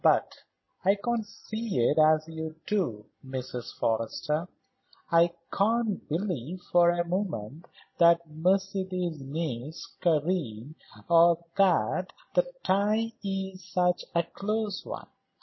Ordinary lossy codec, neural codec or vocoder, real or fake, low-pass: MP3, 24 kbps; none; real; 7.2 kHz